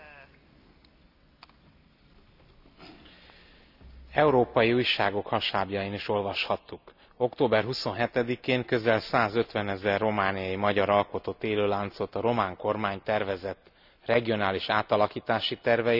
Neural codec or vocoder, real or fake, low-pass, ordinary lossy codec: none; real; 5.4 kHz; none